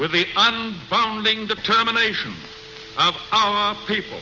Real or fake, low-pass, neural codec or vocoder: real; 7.2 kHz; none